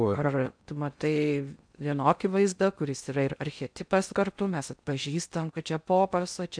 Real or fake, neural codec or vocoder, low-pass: fake; codec, 16 kHz in and 24 kHz out, 0.6 kbps, FocalCodec, streaming, 2048 codes; 9.9 kHz